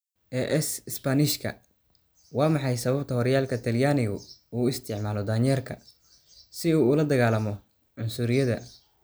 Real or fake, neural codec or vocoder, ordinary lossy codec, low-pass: real; none; none; none